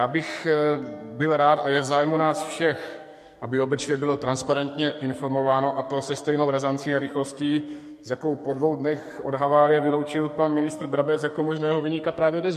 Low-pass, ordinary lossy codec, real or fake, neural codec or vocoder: 14.4 kHz; MP3, 64 kbps; fake; codec, 44.1 kHz, 2.6 kbps, SNAC